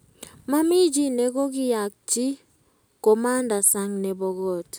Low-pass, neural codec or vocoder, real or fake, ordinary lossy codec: none; none; real; none